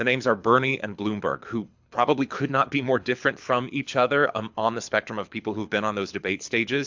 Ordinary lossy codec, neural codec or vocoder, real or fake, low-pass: MP3, 64 kbps; codec, 24 kHz, 6 kbps, HILCodec; fake; 7.2 kHz